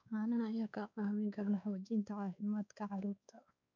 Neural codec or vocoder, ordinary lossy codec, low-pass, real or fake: codec, 16 kHz, 2 kbps, X-Codec, WavLM features, trained on Multilingual LibriSpeech; none; 7.2 kHz; fake